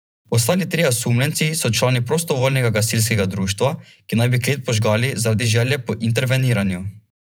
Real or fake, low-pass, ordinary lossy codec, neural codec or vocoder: fake; none; none; vocoder, 44.1 kHz, 128 mel bands every 512 samples, BigVGAN v2